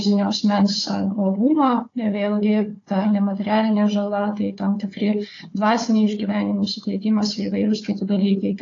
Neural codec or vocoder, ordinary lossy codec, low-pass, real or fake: codec, 16 kHz, 4 kbps, FunCodec, trained on Chinese and English, 50 frames a second; AAC, 32 kbps; 7.2 kHz; fake